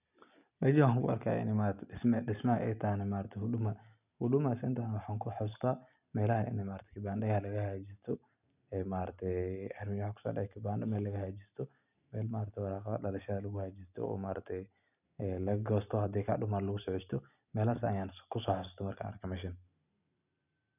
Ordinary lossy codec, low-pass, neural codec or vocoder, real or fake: none; 3.6 kHz; none; real